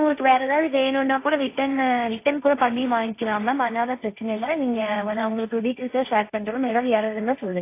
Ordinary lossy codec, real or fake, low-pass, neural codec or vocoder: AAC, 24 kbps; fake; 3.6 kHz; codec, 16 kHz, 1.1 kbps, Voila-Tokenizer